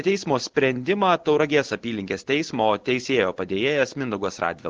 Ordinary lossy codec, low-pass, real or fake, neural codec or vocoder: Opus, 16 kbps; 7.2 kHz; real; none